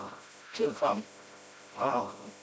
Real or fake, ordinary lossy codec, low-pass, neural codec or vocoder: fake; none; none; codec, 16 kHz, 0.5 kbps, FreqCodec, smaller model